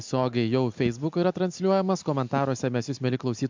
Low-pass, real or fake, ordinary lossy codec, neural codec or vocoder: 7.2 kHz; real; MP3, 64 kbps; none